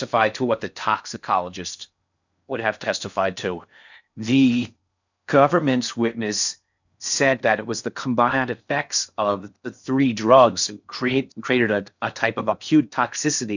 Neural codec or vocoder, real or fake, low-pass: codec, 16 kHz in and 24 kHz out, 0.6 kbps, FocalCodec, streaming, 4096 codes; fake; 7.2 kHz